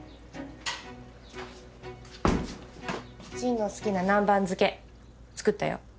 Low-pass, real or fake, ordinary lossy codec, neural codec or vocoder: none; real; none; none